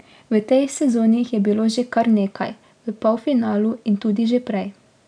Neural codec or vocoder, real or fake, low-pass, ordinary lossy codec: none; real; 9.9 kHz; none